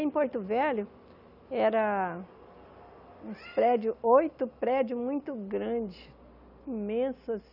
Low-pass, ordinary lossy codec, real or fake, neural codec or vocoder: 5.4 kHz; none; real; none